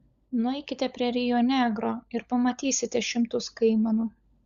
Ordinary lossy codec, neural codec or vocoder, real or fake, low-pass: Opus, 64 kbps; codec, 16 kHz, 16 kbps, FunCodec, trained on LibriTTS, 50 frames a second; fake; 7.2 kHz